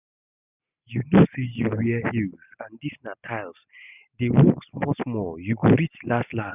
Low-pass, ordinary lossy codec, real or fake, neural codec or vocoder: 3.6 kHz; none; real; none